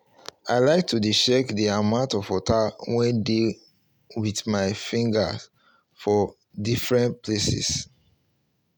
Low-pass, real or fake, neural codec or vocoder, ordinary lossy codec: 19.8 kHz; real; none; none